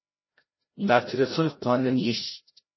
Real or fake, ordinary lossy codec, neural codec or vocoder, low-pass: fake; MP3, 24 kbps; codec, 16 kHz, 0.5 kbps, FreqCodec, larger model; 7.2 kHz